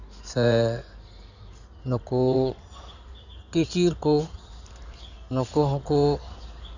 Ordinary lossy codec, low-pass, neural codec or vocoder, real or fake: none; 7.2 kHz; codec, 16 kHz in and 24 kHz out, 2.2 kbps, FireRedTTS-2 codec; fake